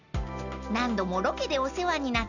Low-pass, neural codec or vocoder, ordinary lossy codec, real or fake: 7.2 kHz; none; none; real